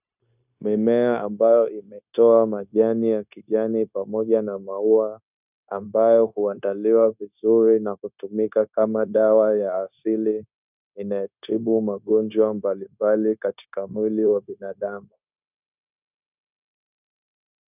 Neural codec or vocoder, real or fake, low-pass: codec, 16 kHz, 0.9 kbps, LongCat-Audio-Codec; fake; 3.6 kHz